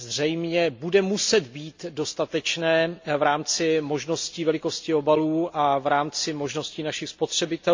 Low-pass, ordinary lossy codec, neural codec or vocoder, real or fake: 7.2 kHz; MP3, 48 kbps; none; real